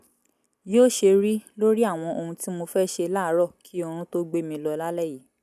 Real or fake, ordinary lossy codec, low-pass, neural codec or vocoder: real; none; 14.4 kHz; none